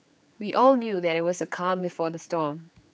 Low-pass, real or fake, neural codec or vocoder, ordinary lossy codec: none; fake; codec, 16 kHz, 4 kbps, X-Codec, HuBERT features, trained on general audio; none